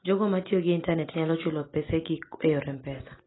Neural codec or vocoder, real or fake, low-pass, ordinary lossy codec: none; real; 7.2 kHz; AAC, 16 kbps